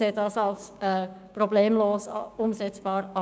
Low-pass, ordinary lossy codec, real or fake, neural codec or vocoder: none; none; fake; codec, 16 kHz, 6 kbps, DAC